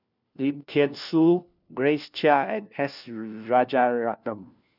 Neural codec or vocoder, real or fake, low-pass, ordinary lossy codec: codec, 16 kHz, 1 kbps, FunCodec, trained on LibriTTS, 50 frames a second; fake; 5.4 kHz; none